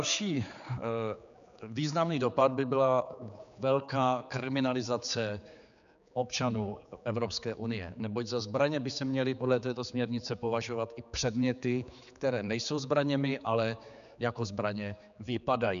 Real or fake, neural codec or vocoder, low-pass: fake; codec, 16 kHz, 4 kbps, X-Codec, HuBERT features, trained on general audio; 7.2 kHz